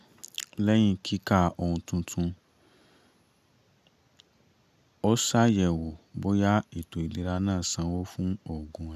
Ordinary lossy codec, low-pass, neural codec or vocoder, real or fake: none; 14.4 kHz; none; real